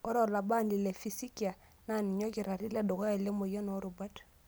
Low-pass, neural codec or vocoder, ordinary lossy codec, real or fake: none; none; none; real